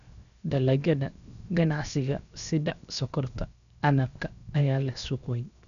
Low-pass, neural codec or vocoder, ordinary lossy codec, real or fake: 7.2 kHz; codec, 16 kHz, 0.7 kbps, FocalCodec; none; fake